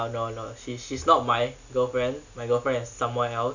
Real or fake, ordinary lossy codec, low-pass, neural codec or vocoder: real; none; 7.2 kHz; none